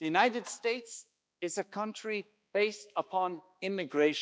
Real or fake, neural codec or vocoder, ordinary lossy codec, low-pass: fake; codec, 16 kHz, 2 kbps, X-Codec, HuBERT features, trained on balanced general audio; none; none